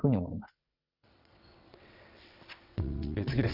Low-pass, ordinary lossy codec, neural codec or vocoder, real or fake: 5.4 kHz; none; vocoder, 22.05 kHz, 80 mel bands, WaveNeXt; fake